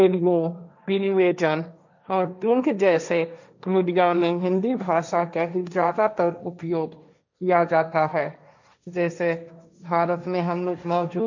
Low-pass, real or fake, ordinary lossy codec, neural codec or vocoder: 7.2 kHz; fake; none; codec, 16 kHz, 1.1 kbps, Voila-Tokenizer